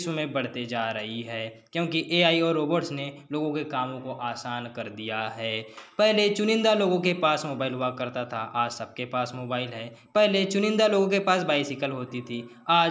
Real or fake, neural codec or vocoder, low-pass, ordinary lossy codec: real; none; none; none